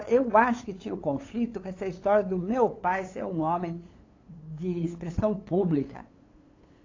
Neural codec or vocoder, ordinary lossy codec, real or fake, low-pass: codec, 16 kHz, 8 kbps, FunCodec, trained on LibriTTS, 25 frames a second; AAC, 32 kbps; fake; 7.2 kHz